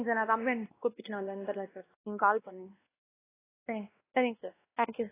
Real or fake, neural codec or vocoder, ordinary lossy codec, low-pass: fake; codec, 16 kHz, 1 kbps, X-Codec, WavLM features, trained on Multilingual LibriSpeech; AAC, 16 kbps; 3.6 kHz